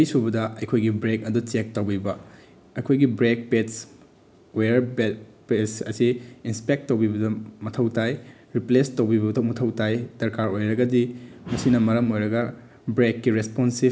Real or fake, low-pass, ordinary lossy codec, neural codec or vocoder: real; none; none; none